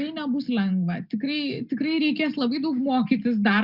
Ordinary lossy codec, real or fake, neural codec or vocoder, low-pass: Opus, 64 kbps; real; none; 5.4 kHz